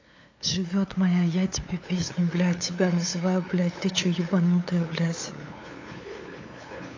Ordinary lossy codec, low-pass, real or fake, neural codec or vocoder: AAC, 32 kbps; 7.2 kHz; fake; codec, 16 kHz, 8 kbps, FunCodec, trained on LibriTTS, 25 frames a second